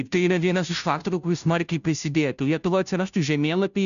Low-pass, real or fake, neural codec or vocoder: 7.2 kHz; fake; codec, 16 kHz, 0.5 kbps, FunCodec, trained on Chinese and English, 25 frames a second